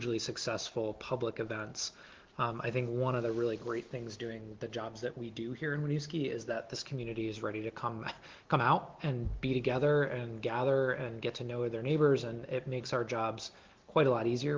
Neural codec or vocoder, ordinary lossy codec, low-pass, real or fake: none; Opus, 16 kbps; 7.2 kHz; real